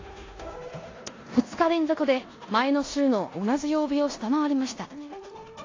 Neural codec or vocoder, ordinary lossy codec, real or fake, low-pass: codec, 16 kHz in and 24 kHz out, 0.9 kbps, LongCat-Audio-Codec, four codebook decoder; AAC, 32 kbps; fake; 7.2 kHz